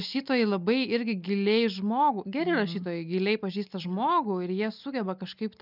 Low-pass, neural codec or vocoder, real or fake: 5.4 kHz; none; real